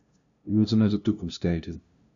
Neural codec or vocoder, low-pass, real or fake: codec, 16 kHz, 0.5 kbps, FunCodec, trained on LibriTTS, 25 frames a second; 7.2 kHz; fake